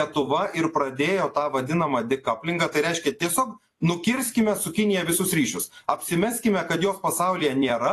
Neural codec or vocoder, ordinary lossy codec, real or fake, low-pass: vocoder, 44.1 kHz, 128 mel bands every 256 samples, BigVGAN v2; AAC, 48 kbps; fake; 14.4 kHz